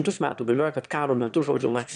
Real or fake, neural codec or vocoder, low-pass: fake; autoencoder, 22.05 kHz, a latent of 192 numbers a frame, VITS, trained on one speaker; 9.9 kHz